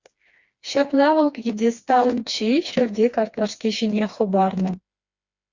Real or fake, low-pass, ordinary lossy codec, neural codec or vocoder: fake; 7.2 kHz; Opus, 64 kbps; codec, 16 kHz, 2 kbps, FreqCodec, smaller model